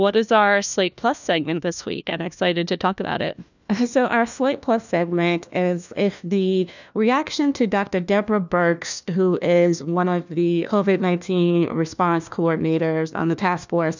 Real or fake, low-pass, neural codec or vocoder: fake; 7.2 kHz; codec, 16 kHz, 1 kbps, FunCodec, trained on LibriTTS, 50 frames a second